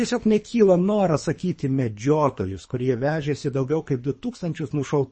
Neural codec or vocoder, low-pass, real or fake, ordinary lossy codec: codec, 24 kHz, 3 kbps, HILCodec; 9.9 kHz; fake; MP3, 32 kbps